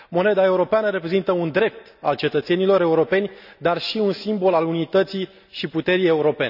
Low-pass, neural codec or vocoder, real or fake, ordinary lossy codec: 5.4 kHz; none; real; none